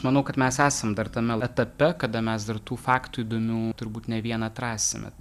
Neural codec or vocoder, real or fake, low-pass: none; real; 14.4 kHz